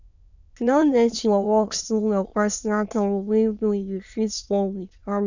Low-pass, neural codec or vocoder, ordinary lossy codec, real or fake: 7.2 kHz; autoencoder, 22.05 kHz, a latent of 192 numbers a frame, VITS, trained on many speakers; none; fake